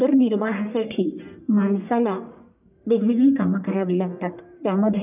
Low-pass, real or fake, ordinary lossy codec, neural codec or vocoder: 3.6 kHz; fake; none; codec, 44.1 kHz, 1.7 kbps, Pupu-Codec